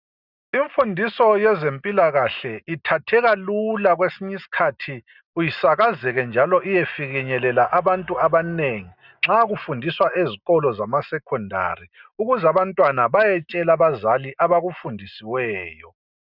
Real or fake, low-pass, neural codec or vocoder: real; 5.4 kHz; none